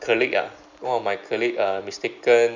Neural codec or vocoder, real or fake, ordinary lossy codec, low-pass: none; real; MP3, 64 kbps; 7.2 kHz